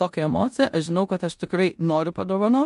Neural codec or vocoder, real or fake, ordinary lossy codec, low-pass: codec, 16 kHz in and 24 kHz out, 0.9 kbps, LongCat-Audio-Codec, four codebook decoder; fake; MP3, 64 kbps; 10.8 kHz